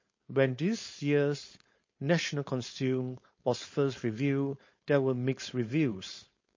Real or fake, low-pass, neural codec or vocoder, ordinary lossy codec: fake; 7.2 kHz; codec, 16 kHz, 4.8 kbps, FACodec; MP3, 32 kbps